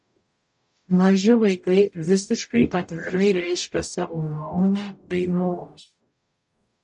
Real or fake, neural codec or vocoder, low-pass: fake; codec, 44.1 kHz, 0.9 kbps, DAC; 10.8 kHz